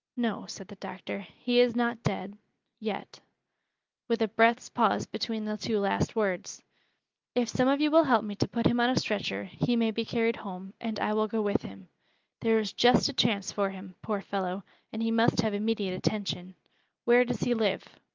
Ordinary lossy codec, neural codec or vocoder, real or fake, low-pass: Opus, 24 kbps; none; real; 7.2 kHz